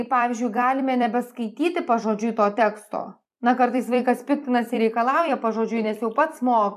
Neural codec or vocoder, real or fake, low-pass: vocoder, 44.1 kHz, 128 mel bands every 256 samples, BigVGAN v2; fake; 14.4 kHz